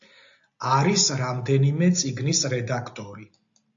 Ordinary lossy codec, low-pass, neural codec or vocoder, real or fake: AAC, 64 kbps; 7.2 kHz; none; real